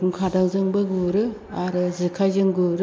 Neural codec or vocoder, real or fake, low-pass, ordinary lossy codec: none; real; none; none